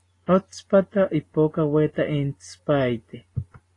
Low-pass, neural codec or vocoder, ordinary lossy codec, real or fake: 10.8 kHz; none; AAC, 32 kbps; real